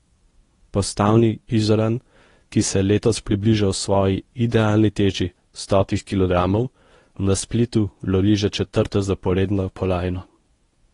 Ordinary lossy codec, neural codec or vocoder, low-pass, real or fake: AAC, 32 kbps; codec, 24 kHz, 0.9 kbps, WavTokenizer, medium speech release version 1; 10.8 kHz; fake